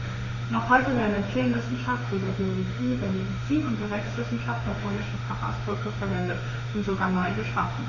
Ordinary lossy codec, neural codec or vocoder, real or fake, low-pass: none; autoencoder, 48 kHz, 32 numbers a frame, DAC-VAE, trained on Japanese speech; fake; 7.2 kHz